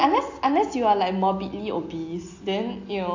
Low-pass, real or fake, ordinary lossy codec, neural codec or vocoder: 7.2 kHz; real; AAC, 48 kbps; none